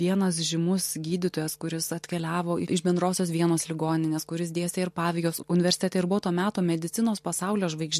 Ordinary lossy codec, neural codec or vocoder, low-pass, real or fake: MP3, 64 kbps; none; 14.4 kHz; real